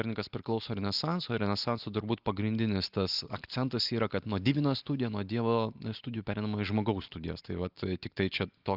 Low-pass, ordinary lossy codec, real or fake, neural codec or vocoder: 5.4 kHz; Opus, 24 kbps; real; none